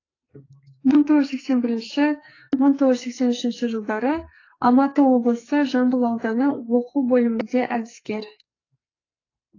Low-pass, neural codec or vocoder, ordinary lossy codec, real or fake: 7.2 kHz; codec, 44.1 kHz, 2.6 kbps, SNAC; AAC, 32 kbps; fake